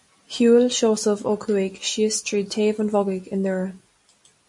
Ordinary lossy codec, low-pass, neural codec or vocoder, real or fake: MP3, 48 kbps; 10.8 kHz; none; real